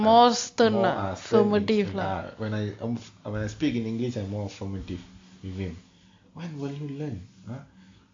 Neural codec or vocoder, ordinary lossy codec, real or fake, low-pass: none; AAC, 48 kbps; real; 7.2 kHz